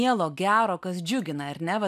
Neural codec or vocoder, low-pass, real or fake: none; 14.4 kHz; real